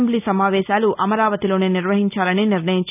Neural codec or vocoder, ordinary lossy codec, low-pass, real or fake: none; none; 3.6 kHz; real